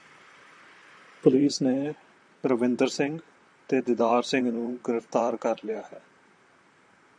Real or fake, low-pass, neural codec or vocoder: fake; 9.9 kHz; vocoder, 44.1 kHz, 128 mel bands, Pupu-Vocoder